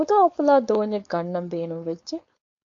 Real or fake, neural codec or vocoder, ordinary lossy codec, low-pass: fake; codec, 16 kHz, 4.8 kbps, FACodec; AAC, 48 kbps; 7.2 kHz